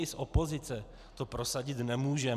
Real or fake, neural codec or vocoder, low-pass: real; none; 14.4 kHz